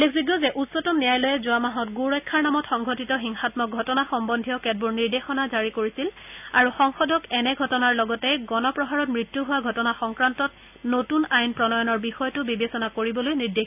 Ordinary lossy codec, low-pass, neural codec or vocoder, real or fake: none; 3.6 kHz; none; real